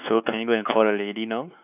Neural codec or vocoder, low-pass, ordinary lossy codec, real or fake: codec, 16 kHz, 4 kbps, X-Codec, WavLM features, trained on Multilingual LibriSpeech; 3.6 kHz; none; fake